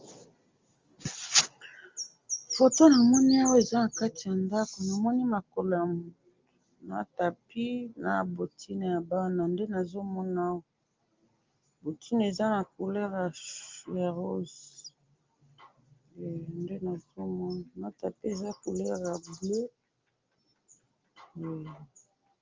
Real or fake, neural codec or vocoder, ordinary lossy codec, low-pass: real; none; Opus, 32 kbps; 7.2 kHz